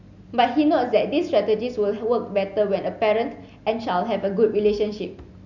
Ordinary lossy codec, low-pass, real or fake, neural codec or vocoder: none; 7.2 kHz; real; none